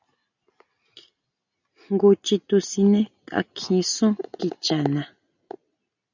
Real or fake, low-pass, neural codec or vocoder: real; 7.2 kHz; none